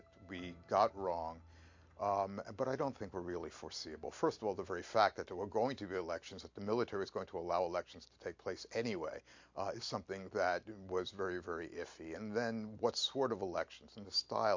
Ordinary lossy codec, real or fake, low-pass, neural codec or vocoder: MP3, 48 kbps; real; 7.2 kHz; none